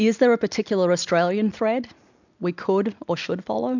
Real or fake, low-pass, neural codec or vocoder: real; 7.2 kHz; none